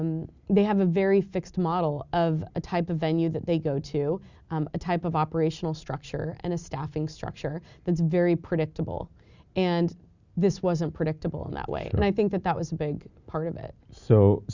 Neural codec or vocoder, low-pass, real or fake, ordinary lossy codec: none; 7.2 kHz; real; Opus, 64 kbps